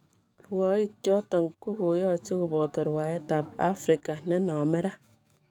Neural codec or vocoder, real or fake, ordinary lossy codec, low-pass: codec, 44.1 kHz, 7.8 kbps, DAC; fake; none; 19.8 kHz